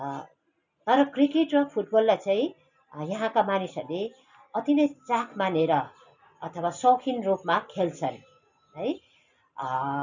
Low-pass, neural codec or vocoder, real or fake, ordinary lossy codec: 7.2 kHz; none; real; none